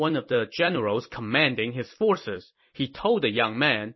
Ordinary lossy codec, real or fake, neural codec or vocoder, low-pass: MP3, 24 kbps; fake; vocoder, 44.1 kHz, 128 mel bands every 256 samples, BigVGAN v2; 7.2 kHz